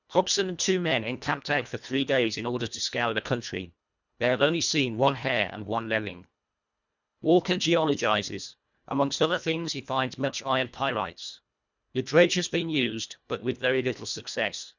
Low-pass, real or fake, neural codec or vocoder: 7.2 kHz; fake; codec, 24 kHz, 1.5 kbps, HILCodec